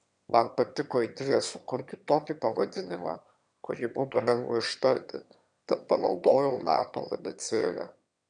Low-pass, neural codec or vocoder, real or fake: 9.9 kHz; autoencoder, 22.05 kHz, a latent of 192 numbers a frame, VITS, trained on one speaker; fake